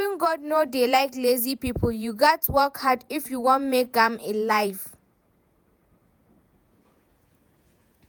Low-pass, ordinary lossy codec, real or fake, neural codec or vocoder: none; none; fake; vocoder, 48 kHz, 128 mel bands, Vocos